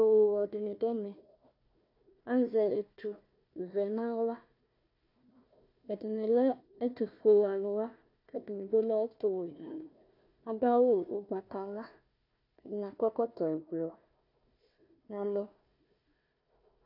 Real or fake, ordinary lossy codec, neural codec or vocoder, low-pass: fake; AAC, 48 kbps; codec, 16 kHz, 1 kbps, FunCodec, trained on Chinese and English, 50 frames a second; 5.4 kHz